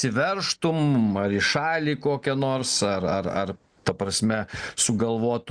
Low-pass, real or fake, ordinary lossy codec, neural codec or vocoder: 9.9 kHz; real; Opus, 64 kbps; none